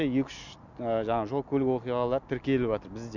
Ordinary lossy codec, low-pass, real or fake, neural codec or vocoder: none; 7.2 kHz; real; none